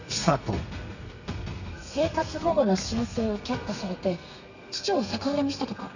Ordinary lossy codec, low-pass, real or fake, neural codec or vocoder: none; 7.2 kHz; fake; codec, 32 kHz, 1.9 kbps, SNAC